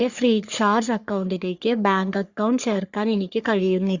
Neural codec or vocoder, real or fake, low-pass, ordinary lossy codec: codec, 44.1 kHz, 3.4 kbps, Pupu-Codec; fake; 7.2 kHz; Opus, 64 kbps